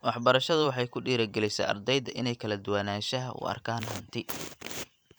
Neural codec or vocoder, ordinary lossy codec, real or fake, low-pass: vocoder, 44.1 kHz, 128 mel bands every 512 samples, BigVGAN v2; none; fake; none